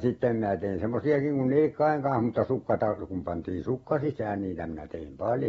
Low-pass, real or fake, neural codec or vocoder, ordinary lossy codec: 19.8 kHz; real; none; AAC, 24 kbps